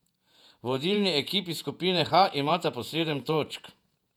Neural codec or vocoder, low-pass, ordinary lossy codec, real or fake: vocoder, 48 kHz, 128 mel bands, Vocos; 19.8 kHz; none; fake